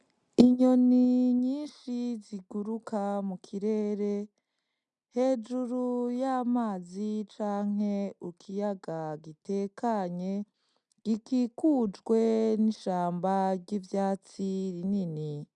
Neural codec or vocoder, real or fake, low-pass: none; real; 10.8 kHz